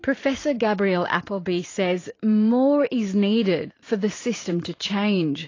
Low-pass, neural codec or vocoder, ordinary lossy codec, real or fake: 7.2 kHz; vocoder, 44.1 kHz, 128 mel bands every 512 samples, BigVGAN v2; AAC, 32 kbps; fake